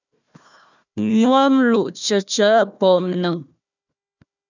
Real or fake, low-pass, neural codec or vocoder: fake; 7.2 kHz; codec, 16 kHz, 1 kbps, FunCodec, trained on Chinese and English, 50 frames a second